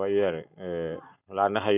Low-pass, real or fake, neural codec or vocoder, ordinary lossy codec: 3.6 kHz; real; none; none